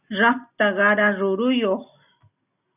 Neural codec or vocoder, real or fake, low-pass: none; real; 3.6 kHz